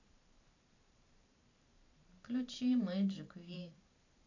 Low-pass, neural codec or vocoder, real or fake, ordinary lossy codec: 7.2 kHz; vocoder, 44.1 kHz, 128 mel bands every 512 samples, BigVGAN v2; fake; AAC, 48 kbps